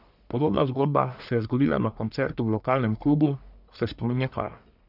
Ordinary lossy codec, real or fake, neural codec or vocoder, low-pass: none; fake; codec, 44.1 kHz, 1.7 kbps, Pupu-Codec; 5.4 kHz